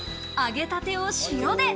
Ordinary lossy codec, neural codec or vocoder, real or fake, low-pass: none; none; real; none